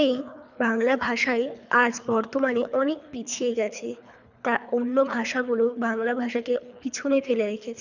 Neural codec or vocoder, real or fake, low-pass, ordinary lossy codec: codec, 24 kHz, 3 kbps, HILCodec; fake; 7.2 kHz; none